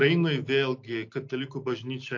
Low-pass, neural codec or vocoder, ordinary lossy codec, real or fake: 7.2 kHz; none; MP3, 48 kbps; real